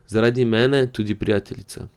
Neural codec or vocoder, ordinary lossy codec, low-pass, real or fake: none; Opus, 32 kbps; 19.8 kHz; real